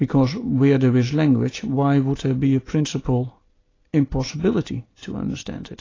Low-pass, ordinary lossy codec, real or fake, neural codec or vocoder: 7.2 kHz; AAC, 32 kbps; real; none